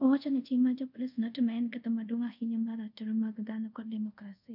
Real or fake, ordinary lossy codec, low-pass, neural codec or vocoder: fake; none; 5.4 kHz; codec, 24 kHz, 0.5 kbps, DualCodec